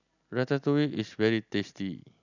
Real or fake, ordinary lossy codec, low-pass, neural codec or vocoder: real; none; 7.2 kHz; none